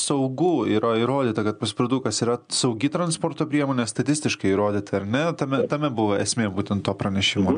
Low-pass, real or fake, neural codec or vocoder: 9.9 kHz; real; none